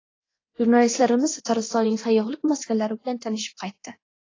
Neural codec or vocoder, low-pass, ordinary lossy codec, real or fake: codec, 24 kHz, 0.9 kbps, DualCodec; 7.2 kHz; AAC, 32 kbps; fake